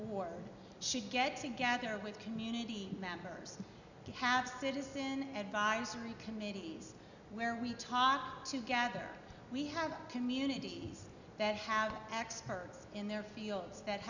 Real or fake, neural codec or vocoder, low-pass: real; none; 7.2 kHz